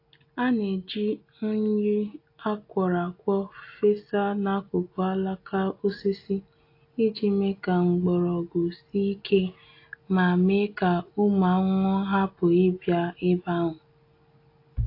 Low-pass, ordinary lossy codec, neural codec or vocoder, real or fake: 5.4 kHz; AAC, 32 kbps; none; real